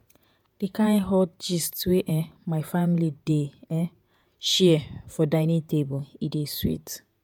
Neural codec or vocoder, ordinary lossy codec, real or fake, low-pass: vocoder, 48 kHz, 128 mel bands, Vocos; MP3, 96 kbps; fake; 19.8 kHz